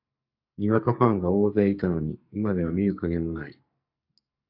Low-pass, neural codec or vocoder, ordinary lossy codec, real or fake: 5.4 kHz; codec, 44.1 kHz, 2.6 kbps, SNAC; AAC, 48 kbps; fake